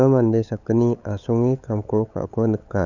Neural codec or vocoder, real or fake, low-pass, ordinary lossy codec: codec, 16 kHz, 8 kbps, FunCodec, trained on LibriTTS, 25 frames a second; fake; 7.2 kHz; none